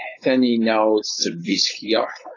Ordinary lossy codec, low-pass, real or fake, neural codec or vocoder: AAC, 32 kbps; 7.2 kHz; fake; codec, 16 kHz, 4.8 kbps, FACodec